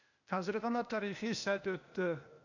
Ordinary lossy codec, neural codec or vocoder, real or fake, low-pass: none; codec, 16 kHz, 0.8 kbps, ZipCodec; fake; 7.2 kHz